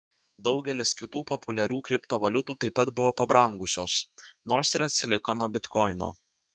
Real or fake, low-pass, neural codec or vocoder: fake; 9.9 kHz; codec, 32 kHz, 1.9 kbps, SNAC